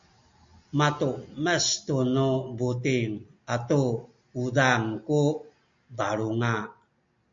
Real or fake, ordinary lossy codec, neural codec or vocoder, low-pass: real; MP3, 48 kbps; none; 7.2 kHz